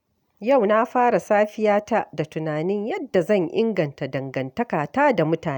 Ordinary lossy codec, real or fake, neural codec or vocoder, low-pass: none; real; none; 19.8 kHz